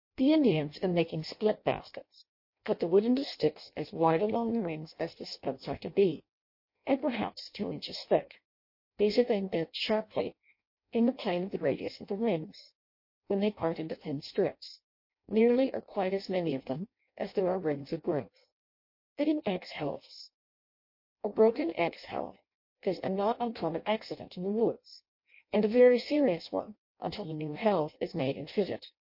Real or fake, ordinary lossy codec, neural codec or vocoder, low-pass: fake; MP3, 32 kbps; codec, 16 kHz in and 24 kHz out, 0.6 kbps, FireRedTTS-2 codec; 5.4 kHz